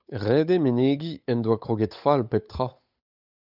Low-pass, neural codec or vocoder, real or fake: 5.4 kHz; codec, 16 kHz, 8 kbps, FunCodec, trained on Chinese and English, 25 frames a second; fake